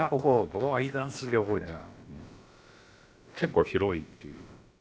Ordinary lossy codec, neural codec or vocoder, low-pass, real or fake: none; codec, 16 kHz, about 1 kbps, DyCAST, with the encoder's durations; none; fake